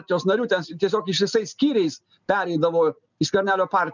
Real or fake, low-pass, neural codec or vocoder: real; 7.2 kHz; none